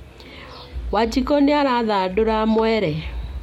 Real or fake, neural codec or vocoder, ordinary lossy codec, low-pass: real; none; MP3, 64 kbps; 19.8 kHz